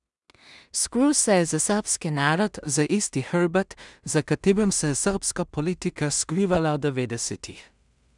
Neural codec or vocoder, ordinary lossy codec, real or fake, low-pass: codec, 16 kHz in and 24 kHz out, 0.4 kbps, LongCat-Audio-Codec, two codebook decoder; none; fake; 10.8 kHz